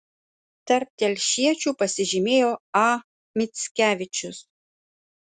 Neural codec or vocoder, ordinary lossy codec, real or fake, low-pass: none; Opus, 64 kbps; real; 10.8 kHz